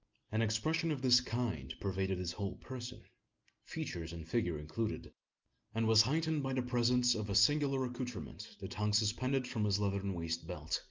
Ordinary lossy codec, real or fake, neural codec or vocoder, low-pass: Opus, 24 kbps; real; none; 7.2 kHz